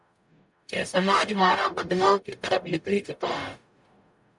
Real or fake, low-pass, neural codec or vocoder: fake; 10.8 kHz; codec, 44.1 kHz, 0.9 kbps, DAC